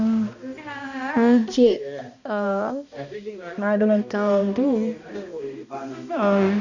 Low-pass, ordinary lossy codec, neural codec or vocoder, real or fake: 7.2 kHz; none; codec, 16 kHz, 1 kbps, X-Codec, HuBERT features, trained on balanced general audio; fake